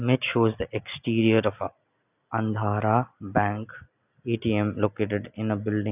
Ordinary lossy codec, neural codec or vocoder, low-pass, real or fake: none; none; 3.6 kHz; real